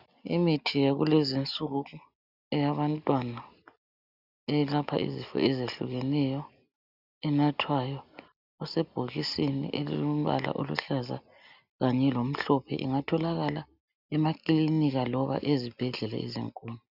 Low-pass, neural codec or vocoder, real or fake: 5.4 kHz; none; real